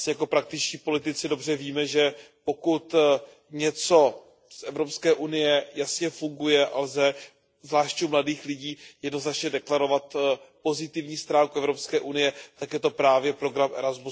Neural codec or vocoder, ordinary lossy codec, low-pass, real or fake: none; none; none; real